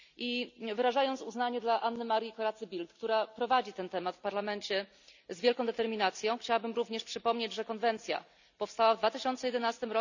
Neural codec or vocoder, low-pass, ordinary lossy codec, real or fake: none; 7.2 kHz; none; real